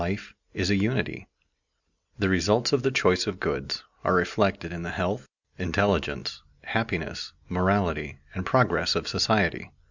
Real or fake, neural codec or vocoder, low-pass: real; none; 7.2 kHz